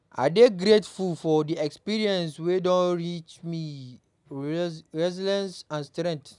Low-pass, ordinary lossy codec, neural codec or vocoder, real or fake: 10.8 kHz; none; none; real